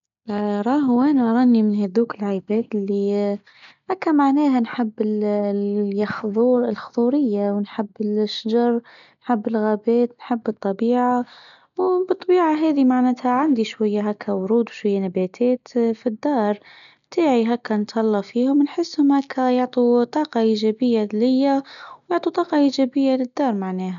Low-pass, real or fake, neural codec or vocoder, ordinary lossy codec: 7.2 kHz; real; none; none